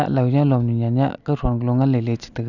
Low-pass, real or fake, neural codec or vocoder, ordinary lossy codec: 7.2 kHz; real; none; none